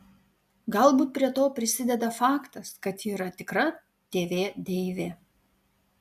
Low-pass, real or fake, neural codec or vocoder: 14.4 kHz; real; none